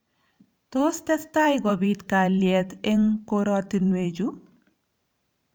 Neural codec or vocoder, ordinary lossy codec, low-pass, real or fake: vocoder, 44.1 kHz, 128 mel bands every 512 samples, BigVGAN v2; none; none; fake